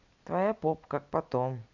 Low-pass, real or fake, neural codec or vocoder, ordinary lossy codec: 7.2 kHz; real; none; none